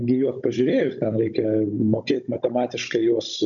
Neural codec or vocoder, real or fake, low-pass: codec, 16 kHz, 16 kbps, FunCodec, trained on Chinese and English, 50 frames a second; fake; 7.2 kHz